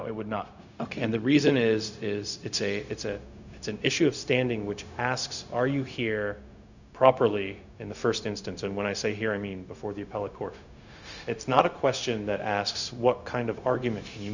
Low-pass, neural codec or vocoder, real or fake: 7.2 kHz; codec, 16 kHz, 0.4 kbps, LongCat-Audio-Codec; fake